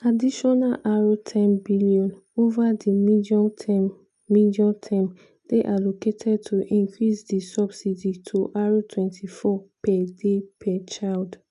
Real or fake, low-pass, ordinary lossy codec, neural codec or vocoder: real; 10.8 kHz; none; none